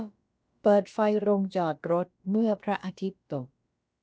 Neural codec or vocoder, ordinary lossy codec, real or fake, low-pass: codec, 16 kHz, about 1 kbps, DyCAST, with the encoder's durations; none; fake; none